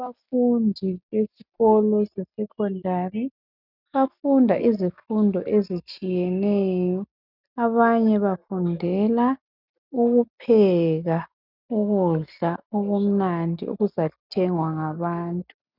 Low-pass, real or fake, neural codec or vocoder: 5.4 kHz; real; none